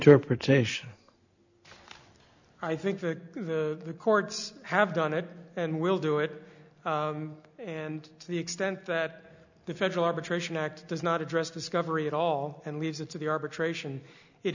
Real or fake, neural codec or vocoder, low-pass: real; none; 7.2 kHz